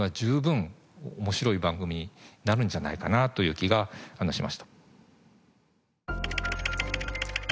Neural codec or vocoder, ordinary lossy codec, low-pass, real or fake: none; none; none; real